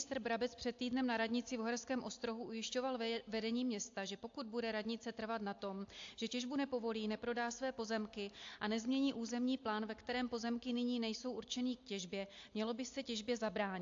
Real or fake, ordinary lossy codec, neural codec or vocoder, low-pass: real; AAC, 48 kbps; none; 7.2 kHz